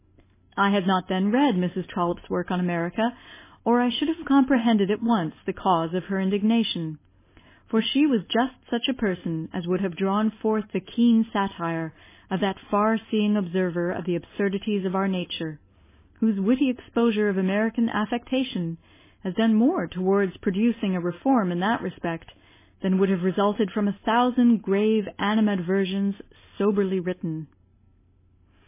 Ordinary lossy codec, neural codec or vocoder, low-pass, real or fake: MP3, 16 kbps; none; 3.6 kHz; real